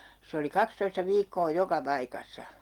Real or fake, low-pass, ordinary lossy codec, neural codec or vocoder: real; 19.8 kHz; Opus, 24 kbps; none